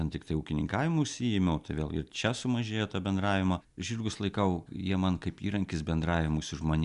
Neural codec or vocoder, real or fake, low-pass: none; real; 10.8 kHz